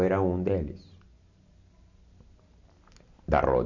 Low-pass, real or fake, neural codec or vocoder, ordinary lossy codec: 7.2 kHz; real; none; none